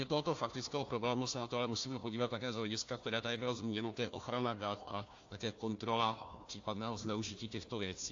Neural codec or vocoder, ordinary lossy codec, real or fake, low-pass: codec, 16 kHz, 1 kbps, FunCodec, trained on Chinese and English, 50 frames a second; AAC, 48 kbps; fake; 7.2 kHz